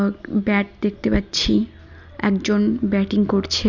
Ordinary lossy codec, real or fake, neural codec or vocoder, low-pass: none; real; none; 7.2 kHz